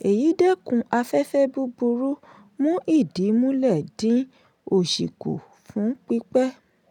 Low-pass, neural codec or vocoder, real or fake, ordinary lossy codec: 19.8 kHz; none; real; none